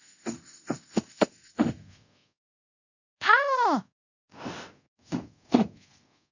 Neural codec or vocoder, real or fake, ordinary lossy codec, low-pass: codec, 24 kHz, 0.5 kbps, DualCodec; fake; none; 7.2 kHz